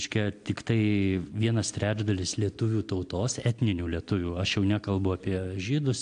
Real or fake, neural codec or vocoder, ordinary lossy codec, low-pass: real; none; AAC, 64 kbps; 9.9 kHz